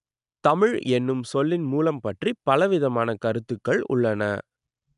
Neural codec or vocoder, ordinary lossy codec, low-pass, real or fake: none; none; 10.8 kHz; real